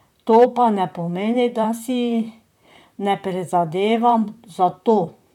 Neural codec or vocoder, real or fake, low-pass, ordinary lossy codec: vocoder, 44.1 kHz, 128 mel bands, Pupu-Vocoder; fake; 19.8 kHz; none